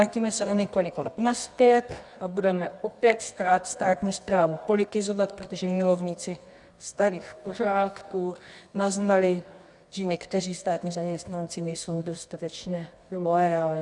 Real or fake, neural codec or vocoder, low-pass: fake; codec, 24 kHz, 0.9 kbps, WavTokenizer, medium music audio release; 10.8 kHz